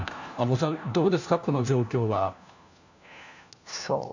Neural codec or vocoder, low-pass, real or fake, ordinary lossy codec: codec, 16 kHz, 1 kbps, FunCodec, trained on LibriTTS, 50 frames a second; 7.2 kHz; fake; none